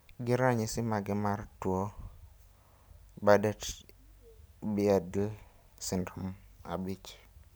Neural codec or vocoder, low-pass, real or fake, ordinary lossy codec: none; none; real; none